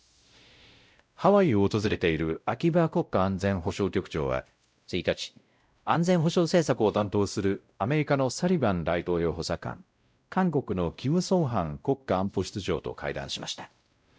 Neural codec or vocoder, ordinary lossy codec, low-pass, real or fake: codec, 16 kHz, 0.5 kbps, X-Codec, WavLM features, trained on Multilingual LibriSpeech; none; none; fake